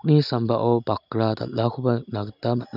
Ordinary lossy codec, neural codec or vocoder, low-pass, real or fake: none; none; 5.4 kHz; real